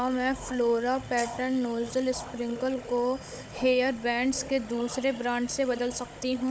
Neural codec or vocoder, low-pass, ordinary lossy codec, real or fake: codec, 16 kHz, 16 kbps, FunCodec, trained on Chinese and English, 50 frames a second; none; none; fake